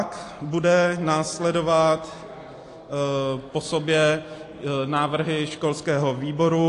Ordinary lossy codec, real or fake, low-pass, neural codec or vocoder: AAC, 48 kbps; real; 10.8 kHz; none